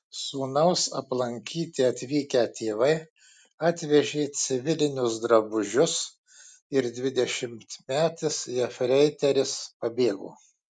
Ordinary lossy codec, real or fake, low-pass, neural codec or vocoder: MP3, 96 kbps; real; 9.9 kHz; none